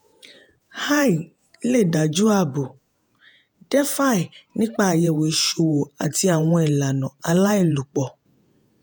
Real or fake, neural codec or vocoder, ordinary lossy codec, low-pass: fake; vocoder, 48 kHz, 128 mel bands, Vocos; none; none